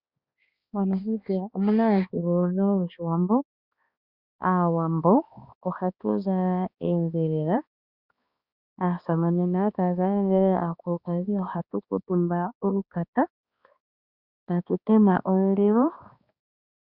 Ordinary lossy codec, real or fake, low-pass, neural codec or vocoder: Opus, 64 kbps; fake; 5.4 kHz; codec, 16 kHz, 2 kbps, X-Codec, HuBERT features, trained on balanced general audio